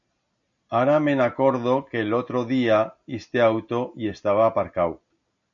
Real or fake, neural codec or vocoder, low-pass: real; none; 7.2 kHz